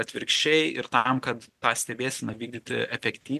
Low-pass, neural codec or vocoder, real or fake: 14.4 kHz; none; real